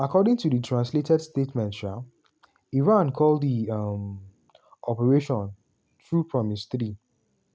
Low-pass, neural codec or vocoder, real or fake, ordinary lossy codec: none; none; real; none